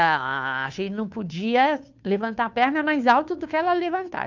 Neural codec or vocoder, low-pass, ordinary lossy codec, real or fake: codec, 16 kHz, 2 kbps, FunCodec, trained on Chinese and English, 25 frames a second; 7.2 kHz; none; fake